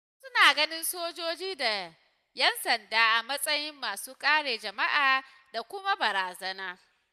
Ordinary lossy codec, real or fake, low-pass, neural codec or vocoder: none; real; 14.4 kHz; none